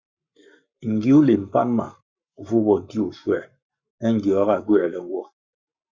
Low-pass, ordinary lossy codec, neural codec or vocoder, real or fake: 7.2 kHz; Opus, 64 kbps; codec, 44.1 kHz, 7.8 kbps, Pupu-Codec; fake